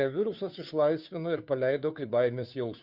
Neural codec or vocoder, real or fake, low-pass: codec, 16 kHz, 2 kbps, FunCodec, trained on Chinese and English, 25 frames a second; fake; 5.4 kHz